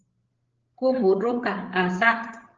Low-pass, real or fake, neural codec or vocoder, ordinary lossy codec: 7.2 kHz; fake; codec, 16 kHz, 8 kbps, FreqCodec, larger model; Opus, 16 kbps